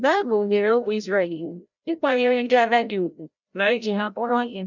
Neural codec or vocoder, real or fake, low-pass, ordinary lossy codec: codec, 16 kHz, 0.5 kbps, FreqCodec, larger model; fake; 7.2 kHz; none